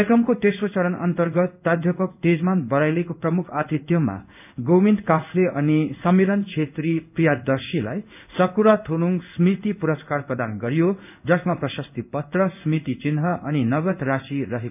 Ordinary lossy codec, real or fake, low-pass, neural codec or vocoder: none; fake; 3.6 kHz; codec, 16 kHz in and 24 kHz out, 1 kbps, XY-Tokenizer